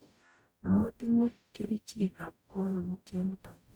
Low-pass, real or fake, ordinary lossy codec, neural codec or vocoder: none; fake; none; codec, 44.1 kHz, 0.9 kbps, DAC